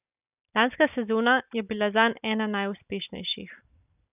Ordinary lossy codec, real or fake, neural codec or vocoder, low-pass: none; real; none; 3.6 kHz